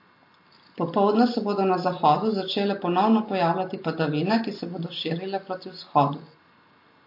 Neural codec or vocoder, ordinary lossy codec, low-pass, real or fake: none; MP3, 32 kbps; 5.4 kHz; real